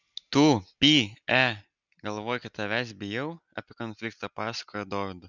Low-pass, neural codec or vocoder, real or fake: 7.2 kHz; none; real